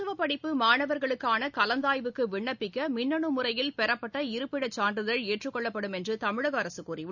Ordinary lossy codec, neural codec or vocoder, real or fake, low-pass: none; none; real; 7.2 kHz